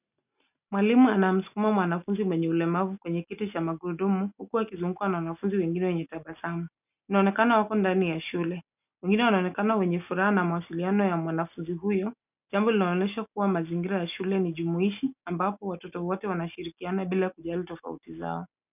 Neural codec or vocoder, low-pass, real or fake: none; 3.6 kHz; real